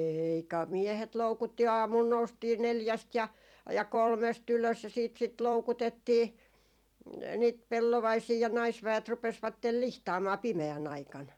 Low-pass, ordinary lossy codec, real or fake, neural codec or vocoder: 19.8 kHz; none; fake; vocoder, 44.1 kHz, 128 mel bands every 256 samples, BigVGAN v2